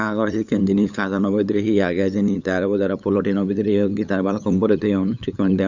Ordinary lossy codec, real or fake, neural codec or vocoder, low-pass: none; fake; codec, 16 kHz, 8 kbps, FunCodec, trained on LibriTTS, 25 frames a second; 7.2 kHz